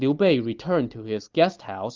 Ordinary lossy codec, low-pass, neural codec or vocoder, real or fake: Opus, 24 kbps; 7.2 kHz; none; real